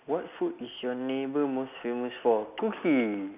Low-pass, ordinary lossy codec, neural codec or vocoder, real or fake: 3.6 kHz; none; none; real